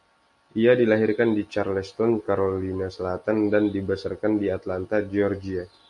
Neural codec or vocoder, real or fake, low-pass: none; real; 10.8 kHz